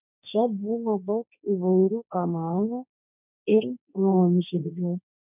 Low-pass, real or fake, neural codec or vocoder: 3.6 kHz; fake; codec, 16 kHz, 1.1 kbps, Voila-Tokenizer